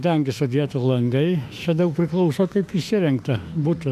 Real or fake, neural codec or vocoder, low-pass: fake; autoencoder, 48 kHz, 32 numbers a frame, DAC-VAE, trained on Japanese speech; 14.4 kHz